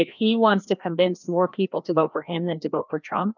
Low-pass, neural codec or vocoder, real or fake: 7.2 kHz; codec, 16 kHz, 1 kbps, FreqCodec, larger model; fake